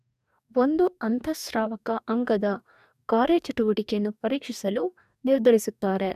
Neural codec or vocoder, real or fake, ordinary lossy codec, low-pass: codec, 44.1 kHz, 2.6 kbps, DAC; fake; none; 14.4 kHz